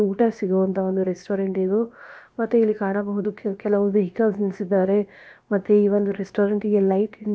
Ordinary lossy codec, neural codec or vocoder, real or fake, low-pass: none; codec, 16 kHz, about 1 kbps, DyCAST, with the encoder's durations; fake; none